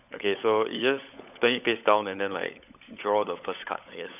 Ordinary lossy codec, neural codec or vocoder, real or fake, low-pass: AAC, 32 kbps; codec, 16 kHz, 16 kbps, FunCodec, trained on LibriTTS, 50 frames a second; fake; 3.6 kHz